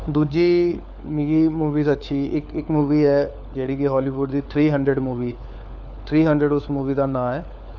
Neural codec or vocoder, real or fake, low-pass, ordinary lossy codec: codec, 16 kHz, 4 kbps, FunCodec, trained on LibriTTS, 50 frames a second; fake; 7.2 kHz; none